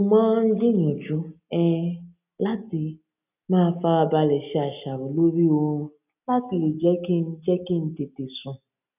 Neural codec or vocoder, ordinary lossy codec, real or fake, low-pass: none; none; real; 3.6 kHz